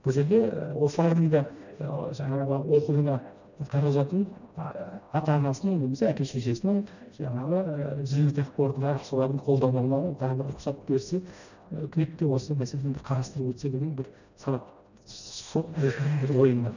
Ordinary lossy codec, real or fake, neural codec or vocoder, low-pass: none; fake; codec, 16 kHz, 1 kbps, FreqCodec, smaller model; 7.2 kHz